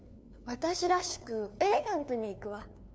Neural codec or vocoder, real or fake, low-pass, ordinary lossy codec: codec, 16 kHz, 2 kbps, FunCodec, trained on LibriTTS, 25 frames a second; fake; none; none